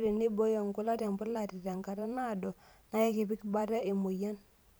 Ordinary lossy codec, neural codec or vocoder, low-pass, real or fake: none; vocoder, 44.1 kHz, 128 mel bands every 512 samples, BigVGAN v2; none; fake